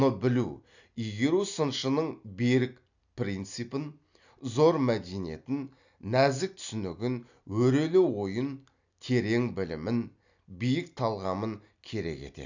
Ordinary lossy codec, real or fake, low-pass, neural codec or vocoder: none; real; 7.2 kHz; none